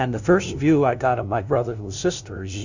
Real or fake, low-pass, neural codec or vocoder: fake; 7.2 kHz; codec, 16 kHz, 0.5 kbps, FunCodec, trained on LibriTTS, 25 frames a second